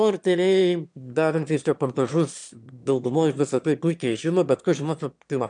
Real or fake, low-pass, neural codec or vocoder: fake; 9.9 kHz; autoencoder, 22.05 kHz, a latent of 192 numbers a frame, VITS, trained on one speaker